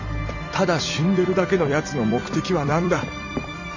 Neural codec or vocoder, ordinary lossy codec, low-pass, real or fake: vocoder, 44.1 kHz, 128 mel bands every 256 samples, BigVGAN v2; none; 7.2 kHz; fake